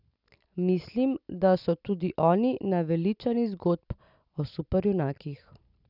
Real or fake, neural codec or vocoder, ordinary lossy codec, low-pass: real; none; none; 5.4 kHz